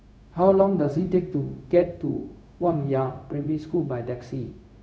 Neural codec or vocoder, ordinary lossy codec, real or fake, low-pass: codec, 16 kHz, 0.4 kbps, LongCat-Audio-Codec; none; fake; none